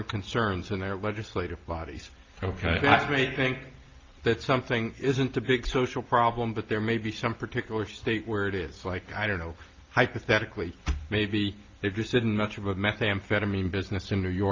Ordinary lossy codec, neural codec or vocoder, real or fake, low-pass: Opus, 24 kbps; none; real; 7.2 kHz